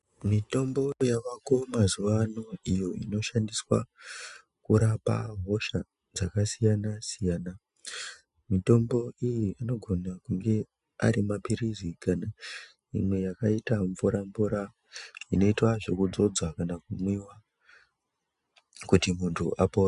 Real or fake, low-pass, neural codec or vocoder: real; 10.8 kHz; none